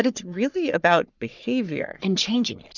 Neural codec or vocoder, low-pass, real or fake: codec, 44.1 kHz, 3.4 kbps, Pupu-Codec; 7.2 kHz; fake